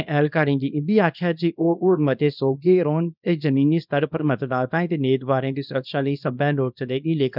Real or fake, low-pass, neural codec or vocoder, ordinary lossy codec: fake; 5.4 kHz; codec, 24 kHz, 0.9 kbps, WavTokenizer, small release; none